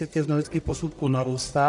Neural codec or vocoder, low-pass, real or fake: codec, 44.1 kHz, 1.7 kbps, Pupu-Codec; 10.8 kHz; fake